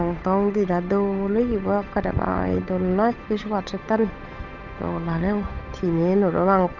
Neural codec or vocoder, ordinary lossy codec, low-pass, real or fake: codec, 16 kHz, 8 kbps, FunCodec, trained on Chinese and English, 25 frames a second; none; 7.2 kHz; fake